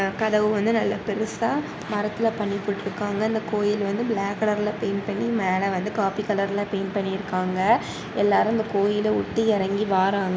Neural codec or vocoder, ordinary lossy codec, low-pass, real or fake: none; none; none; real